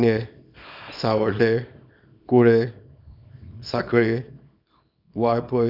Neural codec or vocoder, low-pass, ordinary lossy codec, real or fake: codec, 24 kHz, 0.9 kbps, WavTokenizer, small release; 5.4 kHz; none; fake